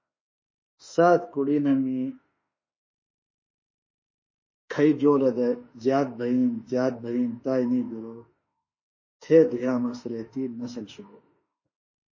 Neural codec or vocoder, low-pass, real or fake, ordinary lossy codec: autoencoder, 48 kHz, 32 numbers a frame, DAC-VAE, trained on Japanese speech; 7.2 kHz; fake; MP3, 32 kbps